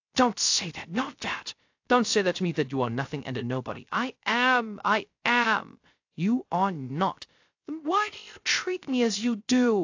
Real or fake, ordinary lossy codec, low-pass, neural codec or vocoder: fake; AAC, 48 kbps; 7.2 kHz; codec, 16 kHz, 0.3 kbps, FocalCodec